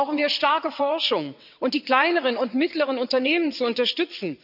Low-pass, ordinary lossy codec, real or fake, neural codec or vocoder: 5.4 kHz; none; fake; vocoder, 22.05 kHz, 80 mel bands, WaveNeXt